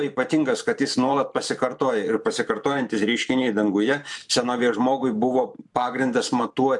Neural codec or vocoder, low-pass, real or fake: vocoder, 44.1 kHz, 128 mel bands every 512 samples, BigVGAN v2; 10.8 kHz; fake